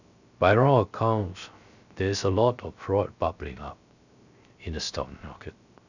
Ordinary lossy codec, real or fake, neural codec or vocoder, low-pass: none; fake; codec, 16 kHz, 0.3 kbps, FocalCodec; 7.2 kHz